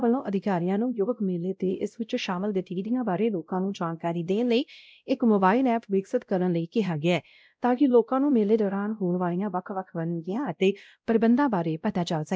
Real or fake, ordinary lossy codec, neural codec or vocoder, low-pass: fake; none; codec, 16 kHz, 0.5 kbps, X-Codec, WavLM features, trained on Multilingual LibriSpeech; none